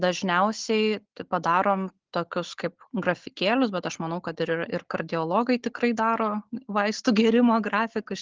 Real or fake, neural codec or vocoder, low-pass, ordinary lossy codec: real; none; 7.2 kHz; Opus, 16 kbps